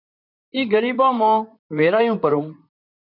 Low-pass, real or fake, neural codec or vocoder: 5.4 kHz; fake; vocoder, 44.1 kHz, 128 mel bands, Pupu-Vocoder